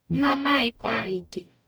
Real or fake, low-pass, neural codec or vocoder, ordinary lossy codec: fake; none; codec, 44.1 kHz, 0.9 kbps, DAC; none